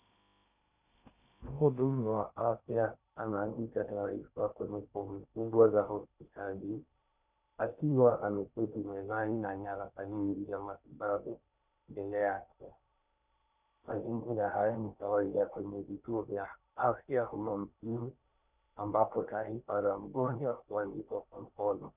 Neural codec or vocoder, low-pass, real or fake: codec, 16 kHz in and 24 kHz out, 0.8 kbps, FocalCodec, streaming, 65536 codes; 3.6 kHz; fake